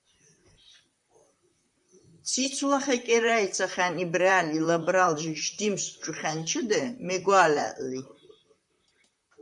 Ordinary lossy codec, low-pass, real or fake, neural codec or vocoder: MP3, 96 kbps; 10.8 kHz; fake; vocoder, 44.1 kHz, 128 mel bands, Pupu-Vocoder